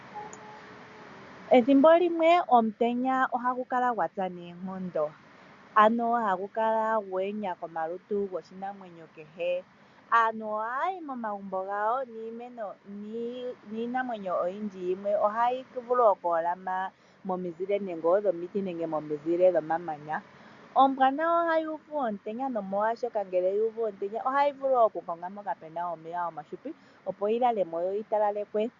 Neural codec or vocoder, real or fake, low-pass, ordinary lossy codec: none; real; 7.2 kHz; MP3, 96 kbps